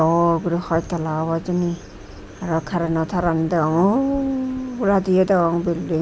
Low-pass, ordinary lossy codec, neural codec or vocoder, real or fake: none; none; none; real